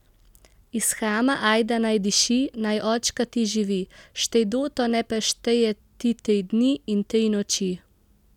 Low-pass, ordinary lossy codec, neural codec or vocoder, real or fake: 19.8 kHz; none; none; real